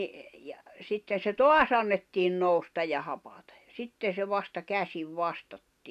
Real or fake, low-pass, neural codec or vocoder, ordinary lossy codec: real; 14.4 kHz; none; none